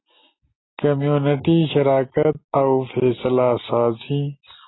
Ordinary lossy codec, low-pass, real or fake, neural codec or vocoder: AAC, 16 kbps; 7.2 kHz; real; none